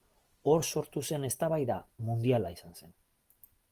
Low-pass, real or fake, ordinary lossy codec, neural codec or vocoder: 14.4 kHz; real; Opus, 24 kbps; none